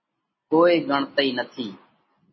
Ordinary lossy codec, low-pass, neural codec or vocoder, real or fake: MP3, 24 kbps; 7.2 kHz; none; real